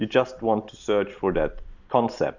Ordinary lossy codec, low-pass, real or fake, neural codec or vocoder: Opus, 64 kbps; 7.2 kHz; real; none